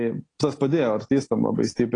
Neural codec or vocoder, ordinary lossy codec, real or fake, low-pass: none; AAC, 32 kbps; real; 9.9 kHz